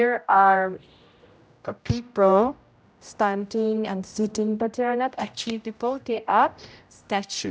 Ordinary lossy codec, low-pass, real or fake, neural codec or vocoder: none; none; fake; codec, 16 kHz, 0.5 kbps, X-Codec, HuBERT features, trained on general audio